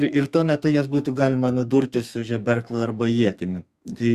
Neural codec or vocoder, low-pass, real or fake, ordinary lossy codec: codec, 32 kHz, 1.9 kbps, SNAC; 14.4 kHz; fake; Opus, 64 kbps